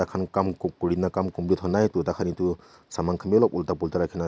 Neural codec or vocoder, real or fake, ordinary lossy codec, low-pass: none; real; none; none